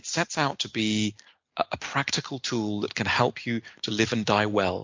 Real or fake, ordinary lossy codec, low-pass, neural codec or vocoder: real; MP3, 64 kbps; 7.2 kHz; none